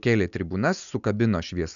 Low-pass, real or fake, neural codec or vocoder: 7.2 kHz; real; none